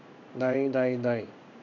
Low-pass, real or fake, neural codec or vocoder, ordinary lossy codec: 7.2 kHz; fake; codec, 16 kHz in and 24 kHz out, 1 kbps, XY-Tokenizer; none